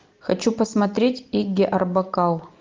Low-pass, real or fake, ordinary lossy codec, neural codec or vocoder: 7.2 kHz; real; Opus, 24 kbps; none